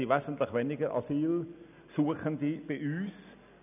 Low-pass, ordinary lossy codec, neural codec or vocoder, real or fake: 3.6 kHz; none; none; real